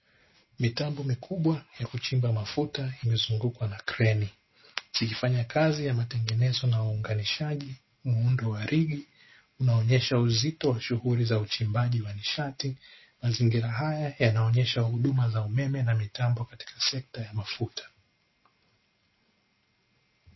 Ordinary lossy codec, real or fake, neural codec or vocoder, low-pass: MP3, 24 kbps; real; none; 7.2 kHz